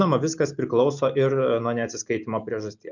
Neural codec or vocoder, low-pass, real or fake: none; 7.2 kHz; real